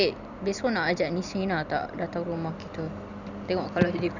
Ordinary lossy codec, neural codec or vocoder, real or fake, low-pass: none; vocoder, 44.1 kHz, 128 mel bands every 256 samples, BigVGAN v2; fake; 7.2 kHz